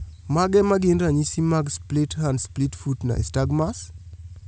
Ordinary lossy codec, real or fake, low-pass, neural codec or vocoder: none; real; none; none